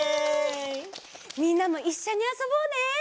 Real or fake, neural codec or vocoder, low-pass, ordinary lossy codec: real; none; none; none